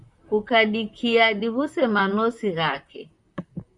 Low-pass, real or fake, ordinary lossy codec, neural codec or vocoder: 10.8 kHz; fake; Opus, 64 kbps; vocoder, 44.1 kHz, 128 mel bands, Pupu-Vocoder